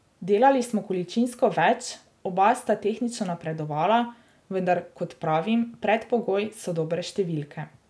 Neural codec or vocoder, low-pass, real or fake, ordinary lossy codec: none; none; real; none